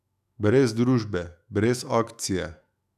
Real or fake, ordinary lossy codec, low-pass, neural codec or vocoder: fake; none; 14.4 kHz; autoencoder, 48 kHz, 128 numbers a frame, DAC-VAE, trained on Japanese speech